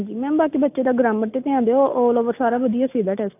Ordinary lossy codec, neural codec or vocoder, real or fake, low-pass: AAC, 32 kbps; none; real; 3.6 kHz